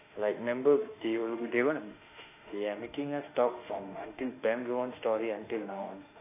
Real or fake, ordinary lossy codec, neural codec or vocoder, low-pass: fake; AAC, 24 kbps; autoencoder, 48 kHz, 32 numbers a frame, DAC-VAE, trained on Japanese speech; 3.6 kHz